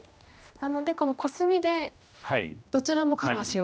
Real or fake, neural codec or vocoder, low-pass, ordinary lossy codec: fake; codec, 16 kHz, 1 kbps, X-Codec, HuBERT features, trained on general audio; none; none